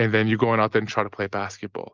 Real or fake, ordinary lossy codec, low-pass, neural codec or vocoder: real; Opus, 24 kbps; 7.2 kHz; none